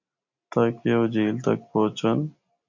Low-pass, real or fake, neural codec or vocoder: 7.2 kHz; real; none